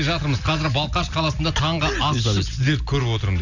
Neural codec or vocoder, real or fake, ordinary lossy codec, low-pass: none; real; none; 7.2 kHz